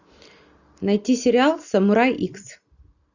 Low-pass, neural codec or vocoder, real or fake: 7.2 kHz; none; real